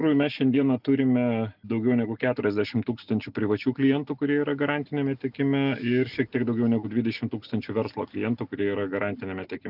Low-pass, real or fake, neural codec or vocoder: 5.4 kHz; real; none